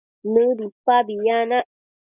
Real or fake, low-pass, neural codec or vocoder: real; 3.6 kHz; none